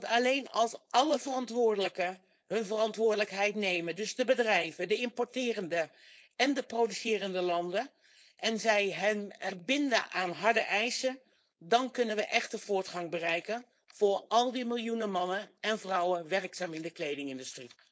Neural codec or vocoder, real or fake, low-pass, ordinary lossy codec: codec, 16 kHz, 4.8 kbps, FACodec; fake; none; none